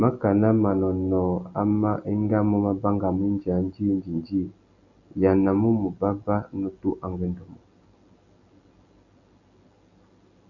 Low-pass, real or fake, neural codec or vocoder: 7.2 kHz; real; none